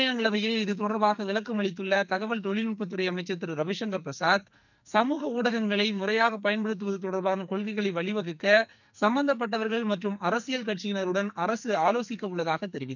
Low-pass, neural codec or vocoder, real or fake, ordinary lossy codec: 7.2 kHz; codec, 44.1 kHz, 2.6 kbps, SNAC; fake; none